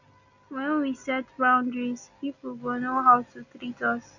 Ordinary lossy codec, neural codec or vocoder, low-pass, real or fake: none; none; 7.2 kHz; real